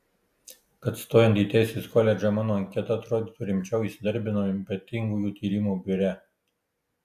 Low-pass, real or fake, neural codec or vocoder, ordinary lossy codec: 14.4 kHz; real; none; AAC, 96 kbps